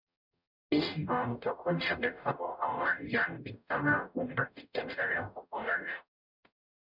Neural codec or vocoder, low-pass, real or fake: codec, 44.1 kHz, 0.9 kbps, DAC; 5.4 kHz; fake